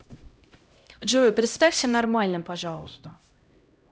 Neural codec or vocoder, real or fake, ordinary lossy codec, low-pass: codec, 16 kHz, 0.5 kbps, X-Codec, HuBERT features, trained on LibriSpeech; fake; none; none